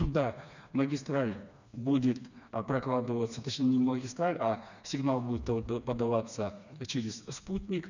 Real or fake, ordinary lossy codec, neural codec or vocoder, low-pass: fake; none; codec, 16 kHz, 2 kbps, FreqCodec, smaller model; 7.2 kHz